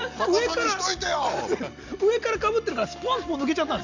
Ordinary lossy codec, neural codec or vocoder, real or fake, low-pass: none; none; real; 7.2 kHz